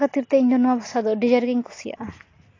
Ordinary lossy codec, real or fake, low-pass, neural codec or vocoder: AAC, 32 kbps; real; 7.2 kHz; none